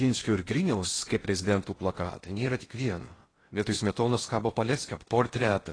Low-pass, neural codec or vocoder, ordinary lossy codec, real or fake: 9.9 kHz; codec, 16 kHz in and 24 kHz out, 0.6 kbps, FocalCodec, streaming, 2048 codes; AAC, 32 kbps; fake